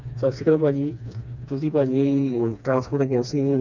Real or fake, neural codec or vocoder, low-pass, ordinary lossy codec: fake; codec, 16 kHz, 2 kbps, FreqCodec, smaller model; 7.2 kHz; none